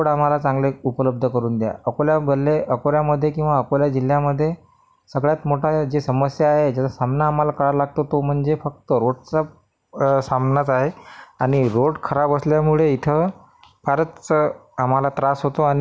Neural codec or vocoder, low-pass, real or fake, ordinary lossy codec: none; none; real; none